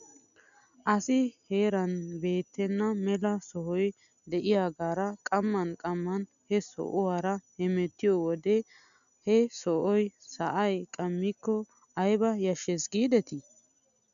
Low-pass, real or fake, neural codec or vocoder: 7.2 kHz; real; none